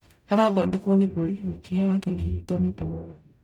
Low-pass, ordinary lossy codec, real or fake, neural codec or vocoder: 19.8 kHz; none; fake; codec, 44.1 kHz, 0.9 kbps, DAC